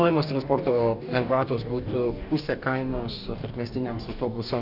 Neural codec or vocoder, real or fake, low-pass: codec, 44.1 kHz, 2.6 kbps, DAC; fake; 5.4 kHz